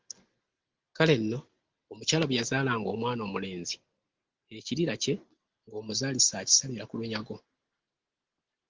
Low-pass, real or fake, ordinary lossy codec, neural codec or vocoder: 7.2 kHz; real; Opus, 16 kbps; none